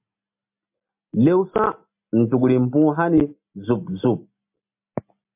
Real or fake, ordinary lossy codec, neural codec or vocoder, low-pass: real; MP3, 32 kbps; none; 3.6 kHz